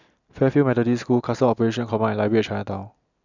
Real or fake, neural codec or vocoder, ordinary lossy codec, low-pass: real; none; none; 7.2 kHz